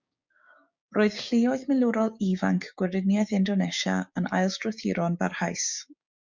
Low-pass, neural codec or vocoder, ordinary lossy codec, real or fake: 7.2 kHz; codec, 16 kHz, 6 kbps, DAC; MP3, 64 kbps; fake